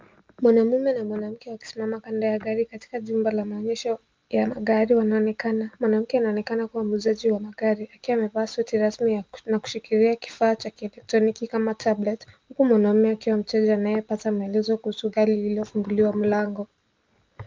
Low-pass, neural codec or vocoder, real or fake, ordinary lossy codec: 7.2 kHz; none; real; Opus, 32 kbps